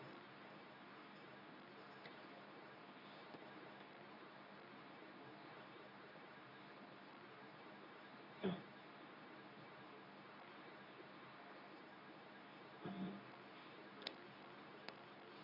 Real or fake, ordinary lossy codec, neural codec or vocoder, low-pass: fake; none; codec, 24 kHz, 0.9 kbps, WavTokenizer, medium speech release version 2; 5.4 kHz